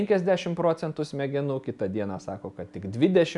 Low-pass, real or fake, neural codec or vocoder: 10.8 kHz; real; none